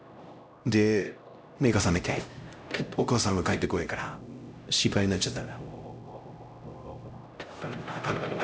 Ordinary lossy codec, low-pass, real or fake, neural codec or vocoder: none; none; fake; codec, 16 kHz, 0.5 kbps, X-Codec, HuBERT features, trained on LibriSpeech